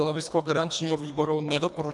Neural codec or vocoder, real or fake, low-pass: codec, 24 kHz, 1.5 kbps, HILCodec; fake; 10.8 kHz